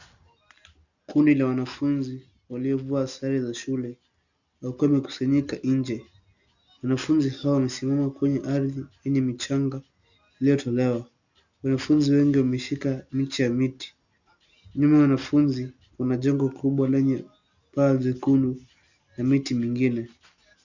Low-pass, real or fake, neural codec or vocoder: 7.2 kHz; real; none